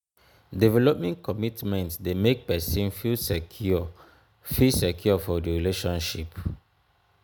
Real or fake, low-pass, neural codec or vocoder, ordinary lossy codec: real; none; none; none